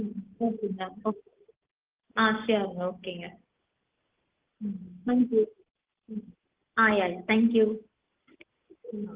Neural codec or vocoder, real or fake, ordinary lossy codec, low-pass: none; real; Opus, 16 kbps; 3.6 kHz